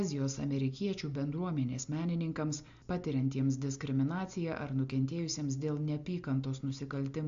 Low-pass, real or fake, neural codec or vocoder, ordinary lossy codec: 7.2 kHz; real; none; AAC, 64 kbps